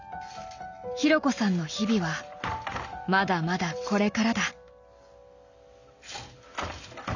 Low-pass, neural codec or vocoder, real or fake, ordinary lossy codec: 7.2 kHz; none; real; none